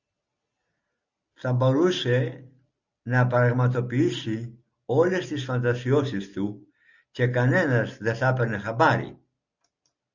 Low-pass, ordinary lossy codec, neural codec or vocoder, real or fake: 7.2 kHz; Opus, 64 kbps; none; real